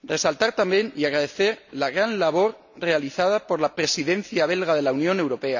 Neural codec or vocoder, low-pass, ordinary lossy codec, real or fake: none; 7.2 kHz; none; real